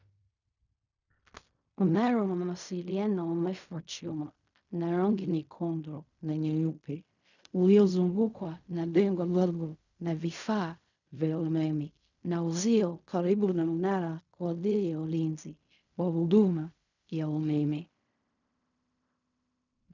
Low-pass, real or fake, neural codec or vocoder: 7.2 kHz; fake; codec, 16 kHz in and 24 kHz out, 0.4 kbps, LongCat-Audio-Codec, fine tuned four codebook decoder